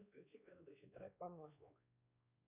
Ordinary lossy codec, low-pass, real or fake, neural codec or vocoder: MP3, 32 kbps; 3.6 kHz; fake; codec, 16 kHz, 2 kbps, X-Codec, WavLM features, trained on Multilingual LibriSpeech